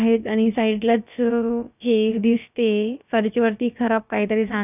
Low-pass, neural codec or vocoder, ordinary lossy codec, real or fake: 3.6 kHz; codec, 16 kHz, about 1 kbps, DyCAST, with the encoder's durations; none; fake